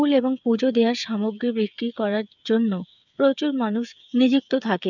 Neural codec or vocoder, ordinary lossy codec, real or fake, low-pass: codec, 16 kHz, 8 kbps, FreqCodec, smaller model; none; fake; 7.2 kHz